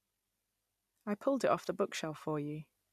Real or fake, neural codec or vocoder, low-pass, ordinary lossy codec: real; none; 14.4 kHz; none